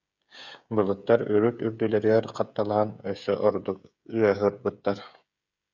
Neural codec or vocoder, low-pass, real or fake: codec, 16 kHz, 16 kbps, FreqCodec, smaller model; 7.2 kHz; fake